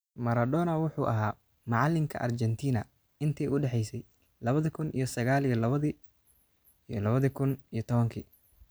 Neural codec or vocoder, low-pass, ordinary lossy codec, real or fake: none; none; none; real